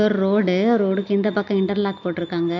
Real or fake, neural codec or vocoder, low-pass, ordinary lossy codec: real; none; 7.2 kHz; none